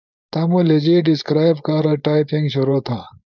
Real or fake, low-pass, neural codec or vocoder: fake; 7.2 kHz; codec, 16 kHz, 4.8 kbps, FACodec